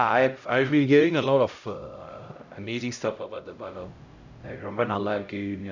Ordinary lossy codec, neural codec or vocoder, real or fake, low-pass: none; codec, 16 kHz, 0.5 kbps, X-Codec, HuBERT features, trained on LibriSpeech; fake; 7.2 kHz